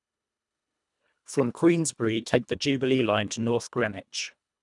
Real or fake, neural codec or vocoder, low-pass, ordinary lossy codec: fake; codec, 24 kHz, 1.5 kbps, HILCodec; 10.8 kHz; none